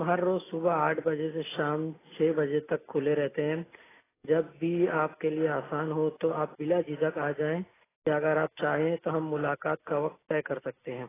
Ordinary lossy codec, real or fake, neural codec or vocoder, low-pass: AAC, 16 kbps; real; none; 3.6 kHz